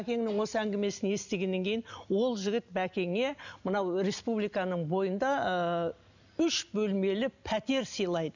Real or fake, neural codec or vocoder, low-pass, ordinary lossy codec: real; none; 7.2 kHz; none